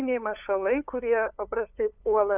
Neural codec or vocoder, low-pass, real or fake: codec, 16 kHz, 8 kbps, FunCodec, trained on LibriTTS, 25 frames a second; 3.6 kHz; fake